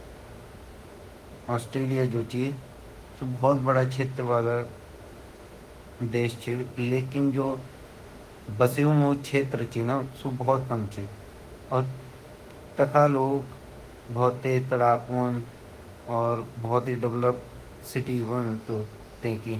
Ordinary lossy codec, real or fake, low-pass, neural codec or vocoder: Opus, 24 kbps; fake; 14.4 kHz; autoencoder, 48 kHz, 32 numbers a frame, DAC-VAE, trained on Japanese speech